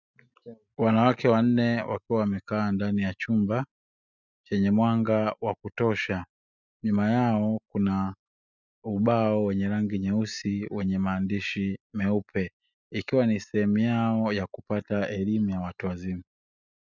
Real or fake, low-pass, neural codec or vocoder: real; 7.2 kHz; none